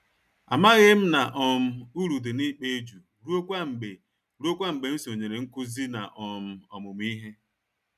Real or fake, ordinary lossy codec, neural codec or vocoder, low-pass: real; none; none; 14.4 kHz